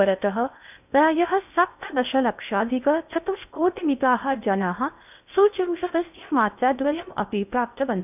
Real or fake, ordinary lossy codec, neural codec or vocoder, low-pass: fake; none; codec, 16 kHz in and 24 kHz out, 0.6 kbps, FocalCodec, streaming, 2048 codes; 3.6 kHz